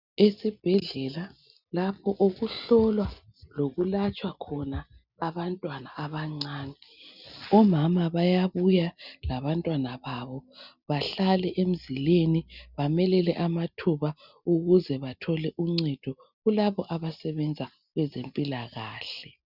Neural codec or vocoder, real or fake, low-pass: none; real; 5.4 kHz